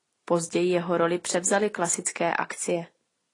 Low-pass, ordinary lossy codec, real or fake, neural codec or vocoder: 10.8 kHz; AAC, 32 kbps; real; none